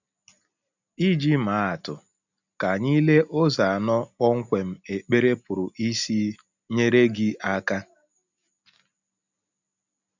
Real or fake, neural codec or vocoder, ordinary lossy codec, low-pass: real; none; none; 7.2 kHz